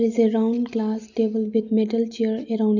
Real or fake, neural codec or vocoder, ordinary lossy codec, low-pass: real; none; none; 7.2 kHz